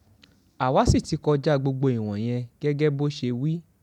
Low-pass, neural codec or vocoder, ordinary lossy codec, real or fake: 19.8 kHz; none; none; real